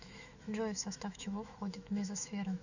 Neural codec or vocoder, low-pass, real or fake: autoencoder, 48 kHz, 128 numbers a frame, DAC-VAE, trained on Japanese speech; 7.2 kHz; fake